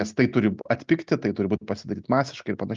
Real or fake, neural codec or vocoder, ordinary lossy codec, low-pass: real; none; Opus, 24 kbps; 7.2 kHz